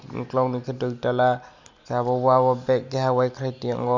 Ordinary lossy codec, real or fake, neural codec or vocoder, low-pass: Opus, 64 kbps; real; none; 7.2 kHz